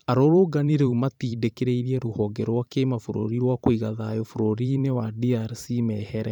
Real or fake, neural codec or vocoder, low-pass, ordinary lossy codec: fake; vocoder, 44.1 kHz, 128 mel bands every 512 samples, BigVGAN v2; 19.8 kHz; none